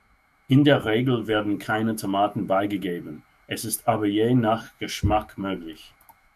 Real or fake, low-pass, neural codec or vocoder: fake; 14.4 kHz; autoencoder, 48 kHz, 128 numbers a frame, DAC-VAE, trained on Japanese speech